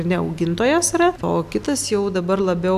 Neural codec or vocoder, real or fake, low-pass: none; real; 14.4 kHz